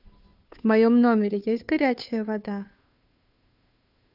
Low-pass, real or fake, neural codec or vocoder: 5.4 kHz; fake; codec, 16 kHz, 2 kbps, FunCodec, trained on Chinese and English, 25 frames a second